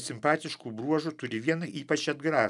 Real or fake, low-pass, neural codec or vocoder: real; 10.8 kHz; none